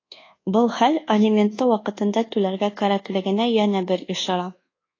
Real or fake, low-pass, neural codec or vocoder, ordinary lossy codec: fake; 7.2 kHz; codec, 24 kHz, 1.2 kbps, DualCodec; AAC, 32 kbps